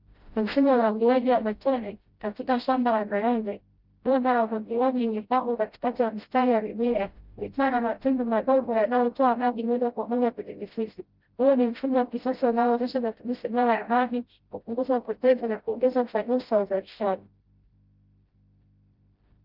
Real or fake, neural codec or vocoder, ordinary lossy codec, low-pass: fake; codec, 16 kHz, 0.5 kbps, FreqCodec, smaller model; Opus, 24 kbps; 5.4 kHz